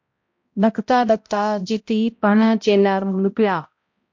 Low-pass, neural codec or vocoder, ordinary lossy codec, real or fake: 7.2 kHz; codec, 16 kHz, 0.5 kbps, X-Codec, HuBERT features, trained on balanced general audio; MP3, 48 kbps; fake